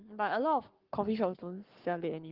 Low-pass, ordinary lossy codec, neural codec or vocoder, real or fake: 5.4 kHz; Opus, 16 kbps; codec, 44.1 kHz, 7.8 kbps, Pupu-Codec; fake